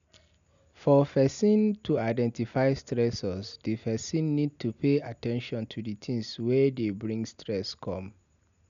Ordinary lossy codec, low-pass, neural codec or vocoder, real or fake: none; 7.2 kHz; none; real